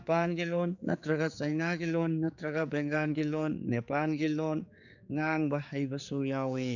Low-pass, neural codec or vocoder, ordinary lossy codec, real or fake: 7.2 kHz; codec, 16 kHz, 4 kbps, X-Codec, HuBERT features, trained on general audio; none; fake